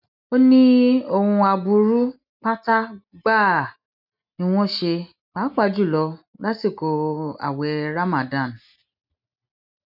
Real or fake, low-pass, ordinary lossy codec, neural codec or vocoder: real; 5.4 kHz; none; none